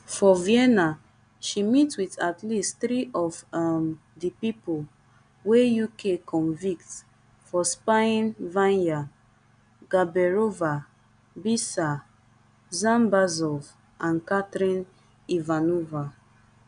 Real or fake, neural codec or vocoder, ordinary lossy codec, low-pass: real; none; none; 9.9 kHz